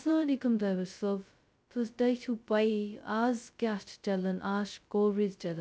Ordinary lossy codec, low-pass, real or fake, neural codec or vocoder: none; none; fake; codec, 16 kHz, 0.2 kbps, FocalCodec